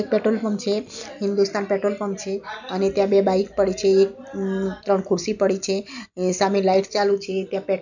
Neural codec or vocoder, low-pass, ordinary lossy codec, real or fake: none; 7.2 kHz; none; real